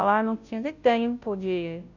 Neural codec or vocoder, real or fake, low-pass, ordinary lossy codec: codec, 16 kHz, 0.5 kbps, FunCodec, trained on Chinese and English, 25 frames a second; fake; 7.2 kHz; none